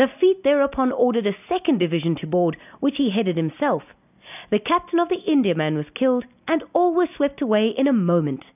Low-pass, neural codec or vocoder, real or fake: 3.6 kHz; none; real